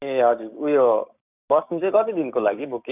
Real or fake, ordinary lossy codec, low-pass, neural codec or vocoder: fake; none; 3.6 kHz; codec, 16 kHz, 6 kbps, DAC